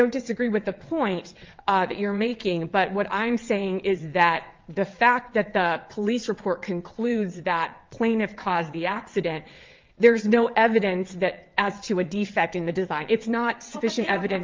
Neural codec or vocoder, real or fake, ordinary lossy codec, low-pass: vocoder, 22.05 kHz, 80 mel bands, WaveNeXt; fake; Opus, 32 kbps; 7.2 kHz